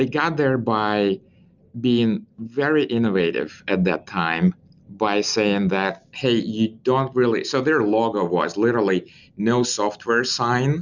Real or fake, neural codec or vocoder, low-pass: real; none; 7.2 kHz